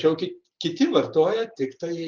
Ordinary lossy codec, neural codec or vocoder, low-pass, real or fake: Opus, 24 kbps; none; 7.2 kHz; real